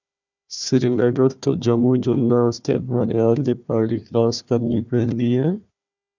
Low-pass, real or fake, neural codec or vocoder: 7.2 kHz; fake; codec, 16 kHz, 1 kbps, FunCodec, trained on Chinese and English, 50 frames a second